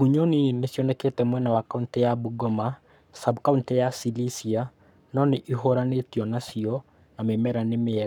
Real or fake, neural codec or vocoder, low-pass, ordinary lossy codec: fake; codec, 44.1 kHz, 7.8 kbps, Pupu-Codec; 19.8 kHz; none